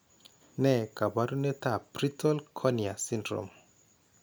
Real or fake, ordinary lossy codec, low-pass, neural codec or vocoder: real; none; none; none